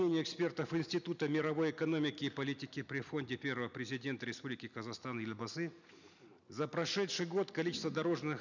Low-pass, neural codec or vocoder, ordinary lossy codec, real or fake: 7.2 kHz; none; none; real